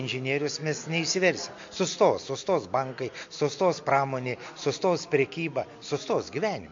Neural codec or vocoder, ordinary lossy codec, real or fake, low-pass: none; MP3, 48 kbps; real; 7.2 kHz